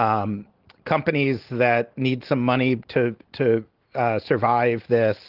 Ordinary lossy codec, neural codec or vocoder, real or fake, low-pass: Opus, 16 kbps; none; real; 5.4 kHz